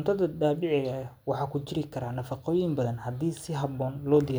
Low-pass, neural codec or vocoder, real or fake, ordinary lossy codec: none; none; real; none